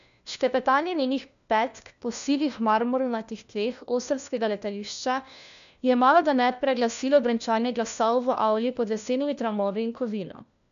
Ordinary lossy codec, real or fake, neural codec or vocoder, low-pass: none; fake; codec, 16 kHz, 1 kbps, FunCodec, trained on LibriTTS, 50 frames a second; 7.2 kHz